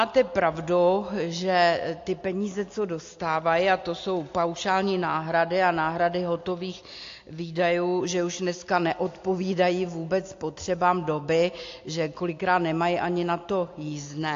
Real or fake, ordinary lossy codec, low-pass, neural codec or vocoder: real; AAC, 48 kbps; 7.2 kHz; none